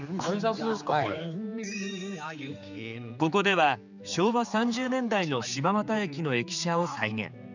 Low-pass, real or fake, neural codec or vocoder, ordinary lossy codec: 7.2 kHz; fake; codec, 16 kHz, 4 kbps, X-Codec, HuBERT features, trained on general audio; none